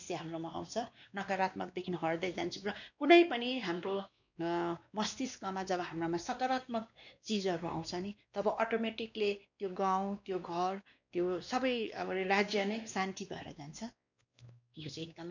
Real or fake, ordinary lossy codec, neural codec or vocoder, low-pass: fake; none; codec, 16 kHz, 2 kbps, X-Codec, WavLM features, trained on Multilingual LibriSpeech; 7.2 kHz